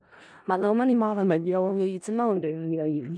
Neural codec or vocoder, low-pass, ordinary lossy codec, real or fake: codec, 16 kHz in and 24 kHz out, 0.4 kbps, LongCat-Audio-Codec, four codebook decoder; 9.9 kHz; none; fake